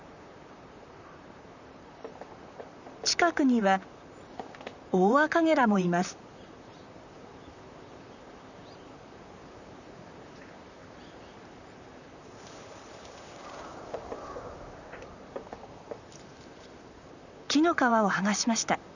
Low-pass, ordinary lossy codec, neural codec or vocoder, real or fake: 7.2 kHz; none; vocoder, 44.1 kHz, 128 mel bands, Pupu-Vocoder; fake